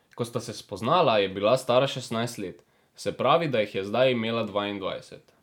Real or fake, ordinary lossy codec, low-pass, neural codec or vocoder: real; none; 19.8 kHz; none